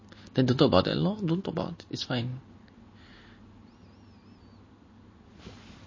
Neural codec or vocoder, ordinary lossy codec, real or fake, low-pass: none; MP3, 32 kbps; real; 7.2 kHz